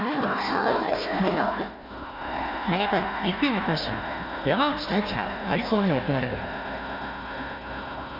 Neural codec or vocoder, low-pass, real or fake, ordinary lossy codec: codec, 16 kHz, 1 kbps, FunCodec, trained on Chinese and English, 50 frames a second; 5.4 kHz; fake; MP3, 48 kbps